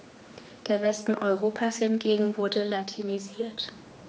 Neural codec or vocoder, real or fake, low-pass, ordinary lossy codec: codec, 16 kHz, 2 kbps, X-Codec, HuBERT features, trained on general audio; fake; none; none